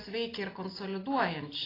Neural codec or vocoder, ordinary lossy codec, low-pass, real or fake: none; AAC, 24 kbps; 5.4 kHz; real